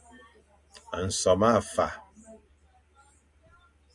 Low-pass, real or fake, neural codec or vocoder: 10.8 kHz; real; none